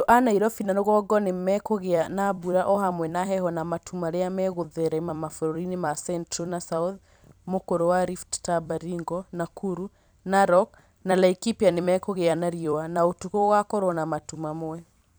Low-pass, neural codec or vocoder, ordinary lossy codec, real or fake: none; vocoder, 44.1 kHz, 128 mel bands every 256 samples, BigVGAN v2; none; fake